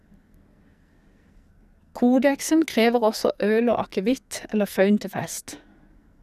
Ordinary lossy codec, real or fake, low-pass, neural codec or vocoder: none; fake; 14.4 kHz; codec, 44.1 kHz, 2.6 kbps, SNAC